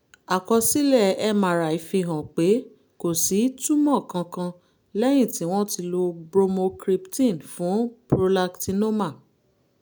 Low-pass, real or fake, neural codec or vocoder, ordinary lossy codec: none; real; none; none